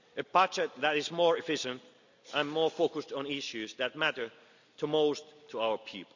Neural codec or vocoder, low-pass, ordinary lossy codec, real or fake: none; 7.2 kHz; none; real